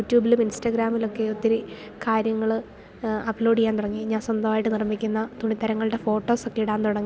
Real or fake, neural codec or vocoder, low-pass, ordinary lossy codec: real; none; none; none